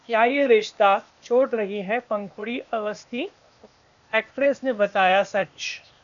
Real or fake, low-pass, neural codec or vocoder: fake; 7.2 kHz; codec, 16 kHz, 0.8 kbps, ZipCodec